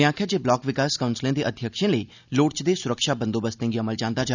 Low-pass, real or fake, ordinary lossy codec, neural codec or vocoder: 7.2 kHz; real; none; none